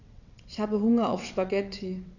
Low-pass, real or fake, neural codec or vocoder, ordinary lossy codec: 7.2 kHz; fake; vocoder, 22.05 kHz, 80 mel bands, WaveNeXt; none